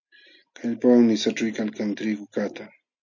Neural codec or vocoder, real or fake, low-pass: none; real; 7.2 kHz